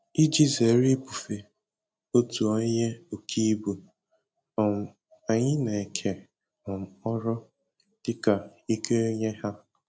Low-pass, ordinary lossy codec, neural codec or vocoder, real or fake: none; none; none; real